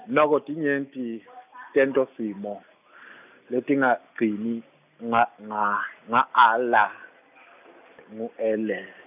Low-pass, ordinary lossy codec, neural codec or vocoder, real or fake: 3.6 kHz; none; none; real